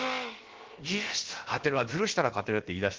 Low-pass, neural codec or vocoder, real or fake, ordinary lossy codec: 7.2 kHz; codec, 16 kHz, about 1 kbps, DyCAST, with the encoder's durations; fake; Opus, 16 kbps